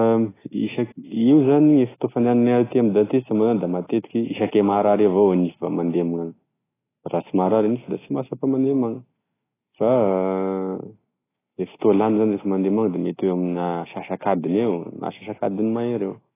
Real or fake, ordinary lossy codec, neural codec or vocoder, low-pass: real; AAC, 16 kbps; none; 3.6 kHz